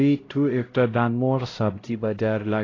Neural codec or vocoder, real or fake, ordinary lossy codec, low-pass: codec, 16 kHz, 0.5 kbps, X-Codec, HuBERT features, trained on LibriSpeech; fake; AAC, 32 kbps; 7.2 kHz